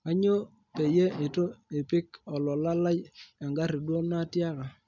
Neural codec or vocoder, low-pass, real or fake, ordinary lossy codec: none; 7.2 kHz; real; none